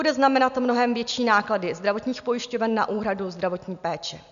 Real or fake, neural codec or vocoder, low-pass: real; none; 7.2 kHz